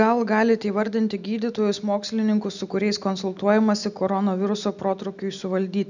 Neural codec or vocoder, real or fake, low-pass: none; real; 7.2 kHz